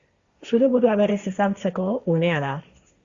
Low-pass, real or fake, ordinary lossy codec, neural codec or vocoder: 7.2 kHz; fake; Opus, 64 kbps; codec, 16 kHz, 1.1 kbps, Voila-Tokenizer